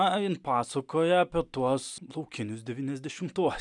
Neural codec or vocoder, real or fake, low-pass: none; real; 10.8 kHz